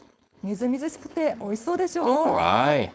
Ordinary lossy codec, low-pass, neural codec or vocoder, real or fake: none; none; codec, 16 kHz, 4.8 kbps, FACodec; fake